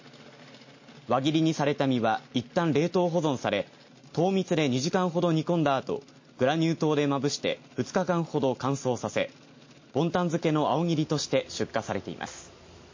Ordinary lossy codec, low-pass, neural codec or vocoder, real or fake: MP3, 32 kbps; 7.2 kHz; none; real